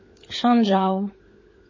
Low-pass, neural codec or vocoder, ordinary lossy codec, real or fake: 7.2 kHz; codec, 16 kHz, 16 kbps, FunCodec, trained on LibriTTS, 50 frames a second; MP3, 32 kbps; fake